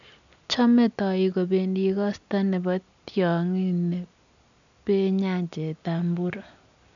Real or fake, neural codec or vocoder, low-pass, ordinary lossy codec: real; none; 7.2 kHz; none